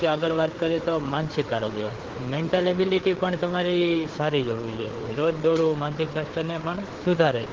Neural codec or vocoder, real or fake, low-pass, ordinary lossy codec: codec, 16 kHz, 4 kbps, FreqCodec, larger model; fake; 7.2 kHz; Opus, 16 kbps